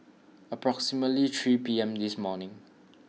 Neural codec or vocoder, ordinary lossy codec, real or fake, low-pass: none; none; real; none